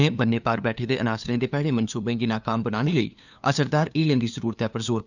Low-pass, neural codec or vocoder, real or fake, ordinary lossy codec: 7.2 kHz; codec, 16 kHz, 2 kbps, FunCodec, trained on LibriTTS, 25 frames a second; fake; none